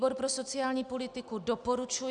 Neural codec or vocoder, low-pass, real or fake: none; 9.9 kHz; real